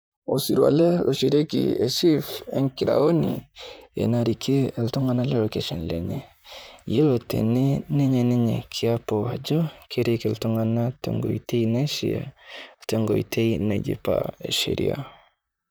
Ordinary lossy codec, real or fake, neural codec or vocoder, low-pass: none; fake; vocoder, 44.1 kHz, 128 mel bands, Pupu-Vocoder; none